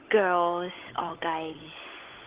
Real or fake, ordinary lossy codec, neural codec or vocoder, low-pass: fake; Opus, 16 kbps; codec, 16 kHz, 16 kbps, FunCodec, trained on LibriTTS, 50 frames a second; 3.6 kHz